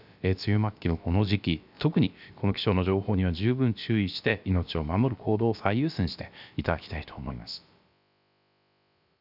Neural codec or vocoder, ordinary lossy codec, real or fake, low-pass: codec, 16 kHz, about 1 kbps, DyCAST, with the encoder's durations; none; fake; 5.4 kHz